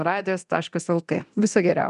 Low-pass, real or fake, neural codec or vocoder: 10.8 kHz; fake; codec, 24 kHz, 0.9 kbps, DualCodec